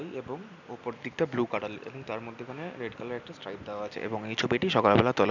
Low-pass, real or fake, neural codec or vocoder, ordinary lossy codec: 7.2 kHz; real; none; none